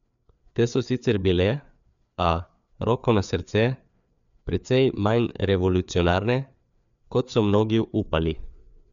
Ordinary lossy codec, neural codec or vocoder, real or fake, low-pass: none; codec, 16 kHz, 4 kbps, FreqCodec, larger model; fake; 7.2 kHz